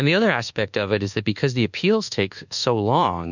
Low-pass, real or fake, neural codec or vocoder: 7.2 kHz; fake; codec, 24 kHz, 1.2 kbps, DualCodec